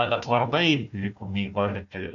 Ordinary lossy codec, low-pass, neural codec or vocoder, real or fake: AAC, 64 kbps; 7.2 kHz; codec, 16 kHz, 1 kbps, FunCodec, trained on Chinese and English, 50 frames a second; fake